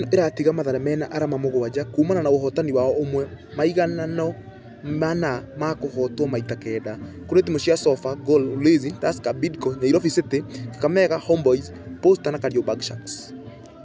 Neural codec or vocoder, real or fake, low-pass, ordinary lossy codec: none; real; none; none